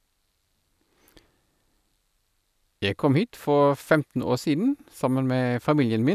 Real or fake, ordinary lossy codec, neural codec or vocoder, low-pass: real; none; none; 14.4 kHz